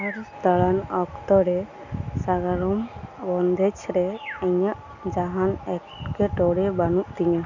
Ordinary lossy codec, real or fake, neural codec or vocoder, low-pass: none; real; none; 7.2 kHz